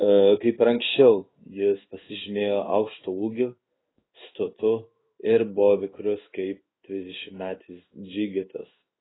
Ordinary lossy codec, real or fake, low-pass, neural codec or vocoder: AAC, 16 kbps; fake; 7.2 kHz; codec, 16 kHz in and 24 kHz out, 1 kbps, XY-Tokenizer